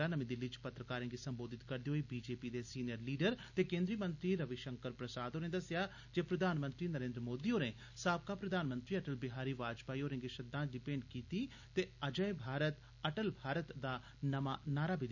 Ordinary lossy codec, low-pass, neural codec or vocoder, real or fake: none; 7.2 kHz; none; real